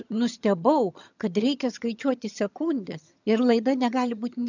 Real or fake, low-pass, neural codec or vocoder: fake; 7.2 kHz; vocoder, 22.05 kHz, 80 mel bands, HiFi-GAN